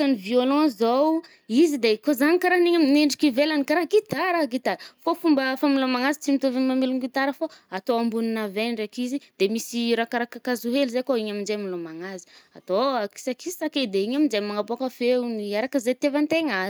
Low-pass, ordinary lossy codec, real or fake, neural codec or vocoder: none; none; real; none